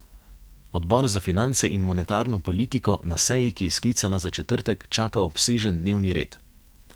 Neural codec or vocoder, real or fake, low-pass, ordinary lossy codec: codec, 44.1 kHz, 2.6 kbps, SNAC; fake; none; none